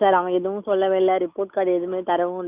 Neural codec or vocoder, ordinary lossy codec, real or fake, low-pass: none; Opus, 64 kbps; real; 3.6 kHz